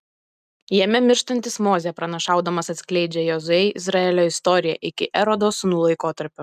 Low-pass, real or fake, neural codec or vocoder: 14.4 kHz; real; none